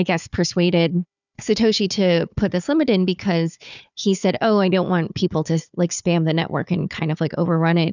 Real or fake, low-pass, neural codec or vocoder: fake; 7.2 kHz; codec, 16 kHz, 4 kbps, FunCodec, trained on Chinese and English, 50 frames a second